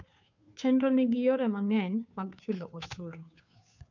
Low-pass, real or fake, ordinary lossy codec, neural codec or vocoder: 7.2 kHz; fake; none; codec, 16 kHz, 2 kbps, FunCodec, trained on Chinese and English, 25 frames a second